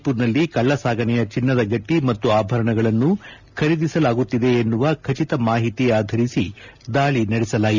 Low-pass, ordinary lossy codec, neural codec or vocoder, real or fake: 7.2 kHz; none; none; real